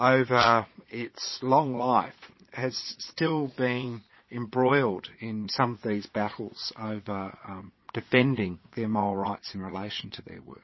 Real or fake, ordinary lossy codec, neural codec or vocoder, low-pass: fake; MP3, 24 kbps; vocoder, 22.05 kHz, 80 mel bands, Vocos; 7.2 kHz